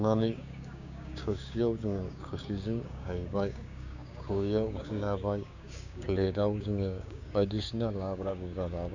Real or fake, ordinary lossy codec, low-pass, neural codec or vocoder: fake; none; 7.2 kHz; codec, 16 kHz, 6 kbps, DAC